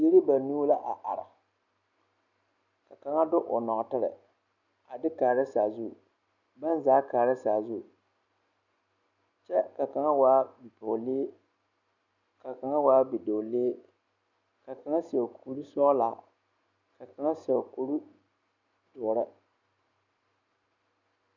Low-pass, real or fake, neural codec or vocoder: 7.2 kHz; real; none